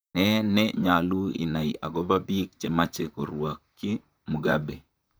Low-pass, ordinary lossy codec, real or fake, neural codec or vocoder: none; none; fake; vocoder, 44.1 kHz, 128 mel bands, Pupu-Vocoder